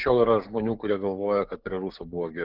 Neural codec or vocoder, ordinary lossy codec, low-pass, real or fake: codec, 16 kHz, 6 kbps, DAC; Opus, 32 kbps; 5.4 kHz; fake